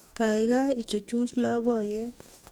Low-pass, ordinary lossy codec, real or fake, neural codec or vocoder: 19.8 kHz; none; fake; codec, 44.1 kHz, 2.6 kbps, DAC